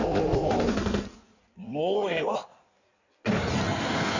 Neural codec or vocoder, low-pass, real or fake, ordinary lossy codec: codec, 16 kHz in and 24 kHz out, 1.1 kbps, FireRedTTS-2 codec; 7.2 kHz; fake; none